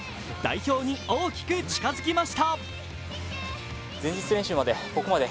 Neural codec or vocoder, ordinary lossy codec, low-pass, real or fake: none; none; none; real